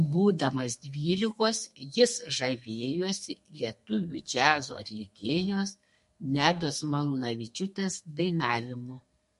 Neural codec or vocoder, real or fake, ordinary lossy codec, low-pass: codec, 32 kHz, 1.9 kbps, SNAC; fake; MP3, 48 kbps; 14.4 kHz